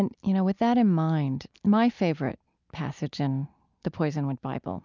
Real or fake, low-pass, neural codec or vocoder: real; 7.2 kHz; none